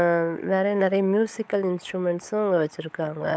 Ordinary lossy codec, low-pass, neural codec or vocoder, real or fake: none; none; codec, 16 kHz, 16 kbps, FunCodec, trained on LibriTTS, 50 frames a second; fake